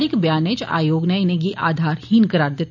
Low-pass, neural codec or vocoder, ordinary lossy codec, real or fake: 7.2 kHz; none; none; real